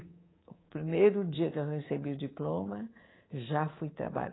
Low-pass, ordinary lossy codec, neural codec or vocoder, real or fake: 7.2 kHz; AAC, 16 kbps; codec, 24 kHz, 3.1 kbps, DualCodec; fake